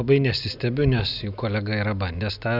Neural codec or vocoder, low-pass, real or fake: none; 5.4 kHz; real